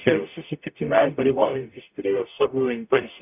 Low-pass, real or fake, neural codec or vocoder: 3.6 kHz; fake; codec, 44.1 kHz, 0.9 kbps, DAC